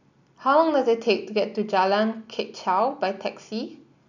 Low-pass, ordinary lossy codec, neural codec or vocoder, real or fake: 7.2 kHz; none; none; real